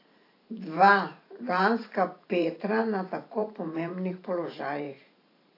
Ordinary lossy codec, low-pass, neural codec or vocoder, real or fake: AAC, 32 kbps; 5.4 kHz; vocoder, 44.1 kHz, 128 mel bands every 512 samples, BigVGAN v2; fake